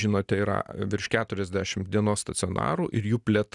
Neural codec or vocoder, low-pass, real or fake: none; 10.8 kHz; real